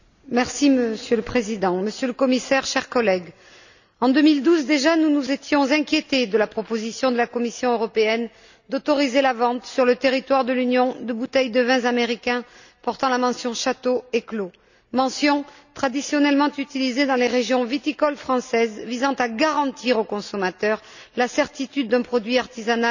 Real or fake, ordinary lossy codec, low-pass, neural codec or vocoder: real; none; 7.2 kHz; none